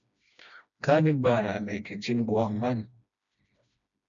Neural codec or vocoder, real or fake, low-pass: codec, 16 kHz, 1 kbps, FreqCodec, smaller model; fake; 7.2 kHz